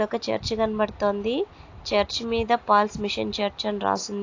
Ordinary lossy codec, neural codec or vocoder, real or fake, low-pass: AAC, 48 kbps; none; real; 7.2 kHz